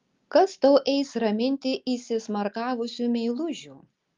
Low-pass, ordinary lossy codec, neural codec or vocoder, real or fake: 7.2 kHz; Opus, 32 kbps; none; real